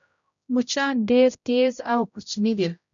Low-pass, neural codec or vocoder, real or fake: 7.2 kHz; codec, 16 kHz, 0.5 kbps, X-Codec, HuBERT features, trained on general audio; fake